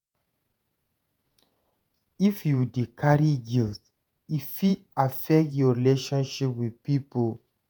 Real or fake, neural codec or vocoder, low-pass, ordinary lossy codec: fake; vocoder, 48 kHz, 128 mel bands, Vocos; none; none